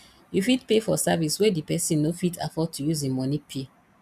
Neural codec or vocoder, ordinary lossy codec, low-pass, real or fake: none; none; 14.4 kHz; real